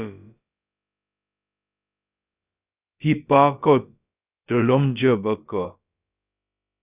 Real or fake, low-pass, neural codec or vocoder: fake; 3.6 kHz; codec, 16 kHz, about 1 kbps, DyCAST, with the encoder's durations